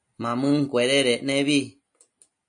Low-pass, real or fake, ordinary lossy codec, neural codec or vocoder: 9.9 kHz; real; MP3, 64 kbps; none